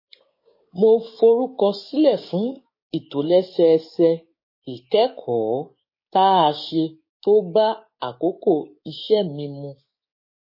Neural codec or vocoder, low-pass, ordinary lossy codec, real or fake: codec, 16 kHz, 6 kbps, DAC; 5.4 kHz; MP3, 24 kbps; fake